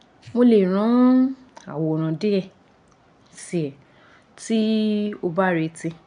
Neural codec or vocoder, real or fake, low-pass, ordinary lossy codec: none; real; 9.9 kHz; none